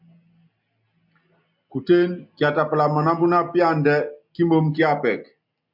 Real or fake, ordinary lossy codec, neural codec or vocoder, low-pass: real; MP3, 48 kbps; none; 5.4 kHz